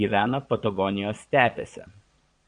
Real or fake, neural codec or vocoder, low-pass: fake; vocoder, 22.05 kHz, 80 mel bands, Vocos; 9.9 kHz